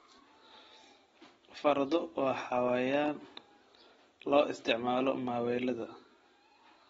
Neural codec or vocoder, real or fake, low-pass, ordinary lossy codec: none; real; 10.8 kHz; AAC, 24 kbps